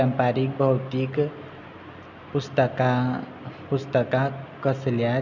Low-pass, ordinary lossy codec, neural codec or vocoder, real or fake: 7.2 kHz; none; none; real